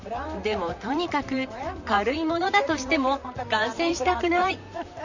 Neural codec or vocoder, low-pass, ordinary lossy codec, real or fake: vocoder, 44.1 kHz, 128 mel bands, Pupu-Vocoder; 7.2 kHz; none; fake